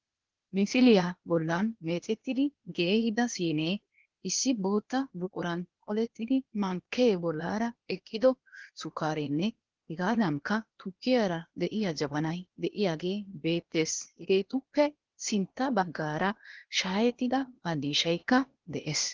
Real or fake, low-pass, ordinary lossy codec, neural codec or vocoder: fake; 7.2 kHz; Opus, 16 kbps; codec, 16 kHz, 0.8 kbps, ZipCodec